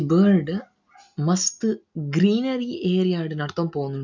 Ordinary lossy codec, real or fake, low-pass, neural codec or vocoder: none; real; 7.2 kHz; none